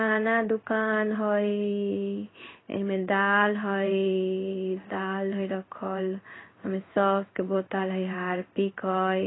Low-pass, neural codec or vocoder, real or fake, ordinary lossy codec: 7.2 kHz; codec, 16 kHz in and 24 kHz out, 1 kbps, XY-Tokenizer; fake; AAC, 16 kbps